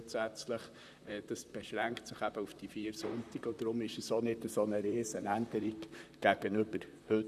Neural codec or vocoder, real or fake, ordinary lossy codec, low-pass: vocoder, 44.1 kHz, 128 mel bands, Pupu-Vocoder; fake; none; 14.4 kHz